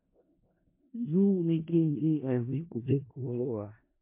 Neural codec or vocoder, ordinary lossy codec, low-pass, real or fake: codec, 16 kHz in and 24 kHz out, 0.4 kbps, LongCat-Audio-Codec, four codebook decoder; MP3, 24 kbps; 3.6 kHz; fake